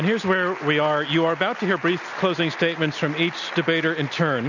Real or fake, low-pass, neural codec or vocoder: real; 7.2 kHz; none